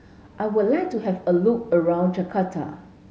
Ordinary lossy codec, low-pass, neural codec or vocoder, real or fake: none; none; none; real